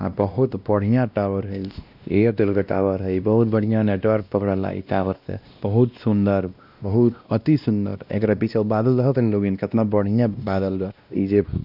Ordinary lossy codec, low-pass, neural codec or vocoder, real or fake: none; 5.4 kHz; codec, 16 kHz, 1 kbps, X-Codec, WavLM features, trained on Multilingual LibriSpeech; fake